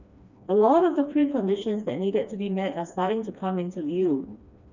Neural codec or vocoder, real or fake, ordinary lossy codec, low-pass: codec, 16 kHz, 2 kbps, FreqCodec, smaller model; fake; none; 7.2 kHz